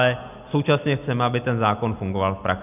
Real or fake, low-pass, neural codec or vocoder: real; 3.6 kHz; none